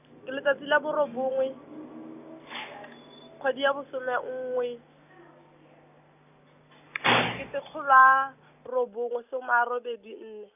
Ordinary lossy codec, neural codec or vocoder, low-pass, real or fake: none; none; 3.6 kHz; real